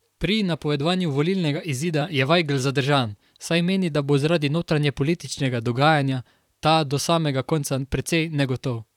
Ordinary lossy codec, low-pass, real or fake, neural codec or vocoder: none; 19.8 kHz; fake; vocoder, 44.1 kHz, 128 mel bands, Pupu-Vocoder